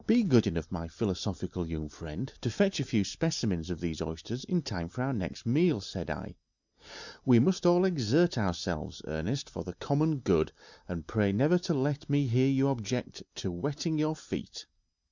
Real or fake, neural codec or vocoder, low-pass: real; none; 7.2 kHz